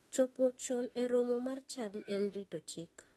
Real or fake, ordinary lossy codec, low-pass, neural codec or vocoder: fake; AAC, 32 kbps; 19.8 kHz; autoencoder, 48 kHz, 32 numbers a frame, DAC-VAE, trained on Japanese speech